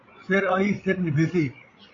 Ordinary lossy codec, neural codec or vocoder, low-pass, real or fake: AAC, 32 kbps; codec, 16 kHz, 16 kbps, FreqCodec, larger model; 7.2 kHz; fake